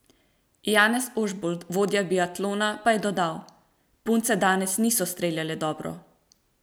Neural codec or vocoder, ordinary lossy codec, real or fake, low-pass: none; none; real; none